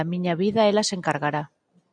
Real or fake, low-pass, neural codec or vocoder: real; 9.9 kHz; none